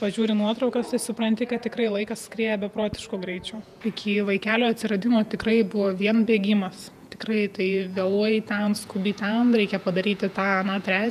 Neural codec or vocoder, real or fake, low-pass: vocoder, 48 kHz, 128 mel bands, Vocos; fake; 14.4 kHz